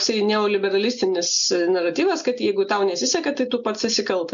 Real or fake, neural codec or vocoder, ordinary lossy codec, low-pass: real; none; MP3, 64 kbps; 7.2 kHz